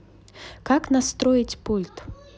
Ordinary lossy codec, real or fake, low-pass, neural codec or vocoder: none; real; none; none